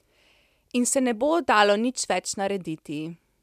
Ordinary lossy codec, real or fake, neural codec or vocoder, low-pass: none; real; none; 14.4 kHz